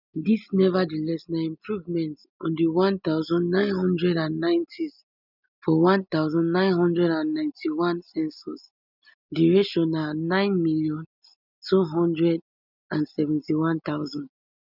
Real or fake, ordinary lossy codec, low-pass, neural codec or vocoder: real; none; 5.4 kHz; none